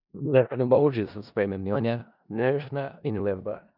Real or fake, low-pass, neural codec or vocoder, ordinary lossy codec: fake; 5.4 kHz; codec, 16 kHz in and 24 kHz out, 0.4 kbps, LongCat-Audio-Codec, four codebook decoder; none